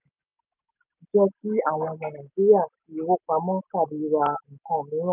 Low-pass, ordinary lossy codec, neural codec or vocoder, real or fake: 3.6 kHz; none; none; real